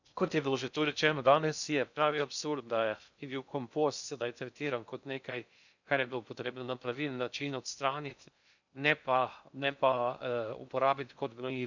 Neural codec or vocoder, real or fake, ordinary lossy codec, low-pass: codec, 16 kHz in and 24 kHz out, 0.6 kbps, FocalCodec, streaming, 2048 codes; fake; none; 7.2 kHz